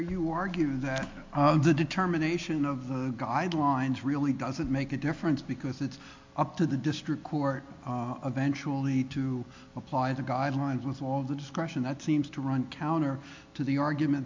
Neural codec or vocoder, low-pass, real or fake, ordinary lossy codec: none; 7.2 kHz; real; MP3, 48 kbps